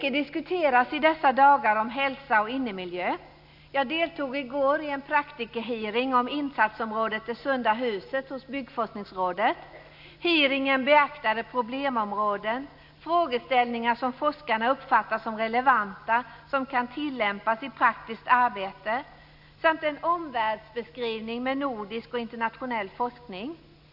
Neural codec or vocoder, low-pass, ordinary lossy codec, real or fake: none; 5.4 kHz; none; real